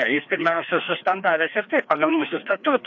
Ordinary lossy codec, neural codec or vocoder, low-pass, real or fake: AAC, 48 kbps; codec, 16 kHz, 2 kbps, FreqCodec, larger model; 7.2 kHz; fake